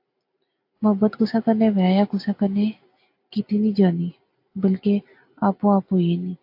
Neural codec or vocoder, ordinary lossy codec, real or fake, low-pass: none; AAC, 32 kbps; real; 5.4 kHz